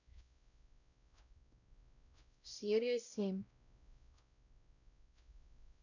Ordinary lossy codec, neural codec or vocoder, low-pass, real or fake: none; codec, 16 kHz, 0.5 kbps, X-Codec, WavLM features, trained on Multilingual LibriSpeech; 7.2 kHz; fake